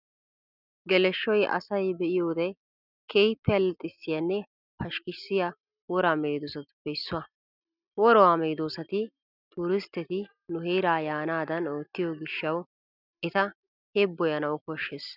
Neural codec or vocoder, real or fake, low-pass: none; real; 5.4 kHz